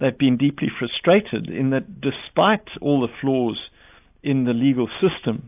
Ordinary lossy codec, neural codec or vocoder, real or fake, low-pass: AAC, 32 kbps; none; real; 3.6 kHz